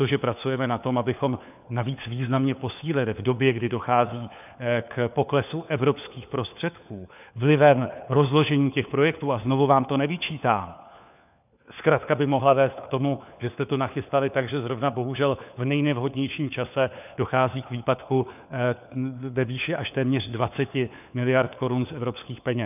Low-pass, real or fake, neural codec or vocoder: 3.6 kHz; fake; codec, 16 kHz, 4 kbps, FunCodec, trained on LibriTTS, 50 frames a second